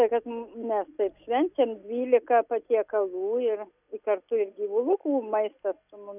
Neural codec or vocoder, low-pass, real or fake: none; 3.6 kHz; real